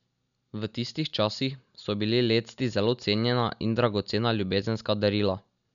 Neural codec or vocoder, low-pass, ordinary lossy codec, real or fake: none; 7.2 kHz; none; real